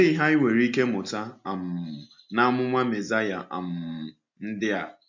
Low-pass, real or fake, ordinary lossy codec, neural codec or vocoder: 7.2 kHz; real; MP3, 64 kbps; none